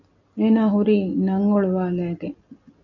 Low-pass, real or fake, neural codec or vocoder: 7.2 kHz; real; none